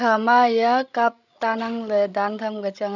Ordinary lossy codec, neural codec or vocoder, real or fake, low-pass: none; codec, 16 kHz, 16 kbps, FreqCodec, larger model; fake; 7.2 kHz